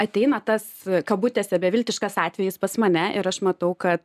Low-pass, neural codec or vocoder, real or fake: 14.4 kHz; none; real